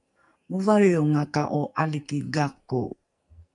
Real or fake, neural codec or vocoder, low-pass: fake; codec, 44.1 kHz, 2.6 kbps, SNAC; 10.8 kHz